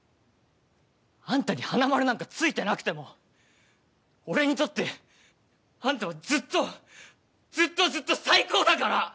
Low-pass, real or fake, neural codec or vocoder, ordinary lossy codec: none; real; none; none